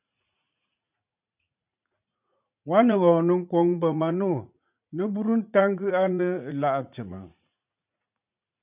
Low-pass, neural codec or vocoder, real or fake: 3.6 kHz; vocoder, 44.1 kHz, 80 mel bands, Vocos; fake